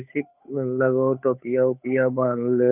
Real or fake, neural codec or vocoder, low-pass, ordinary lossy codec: fake; codec, 16 kHz, 8 kbps, FunCodec, trained on Chinese and English, 25 frames a second; 3.6 kHz; none